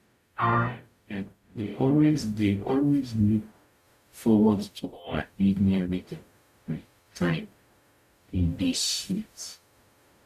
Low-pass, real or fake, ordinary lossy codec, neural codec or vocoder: 14.4 kHz; fake; none; codec, 44.1 kHz, 0.9 kbps, DAC